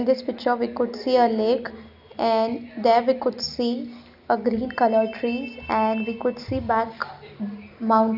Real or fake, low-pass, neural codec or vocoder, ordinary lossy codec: real; 5.4 kHz; none; none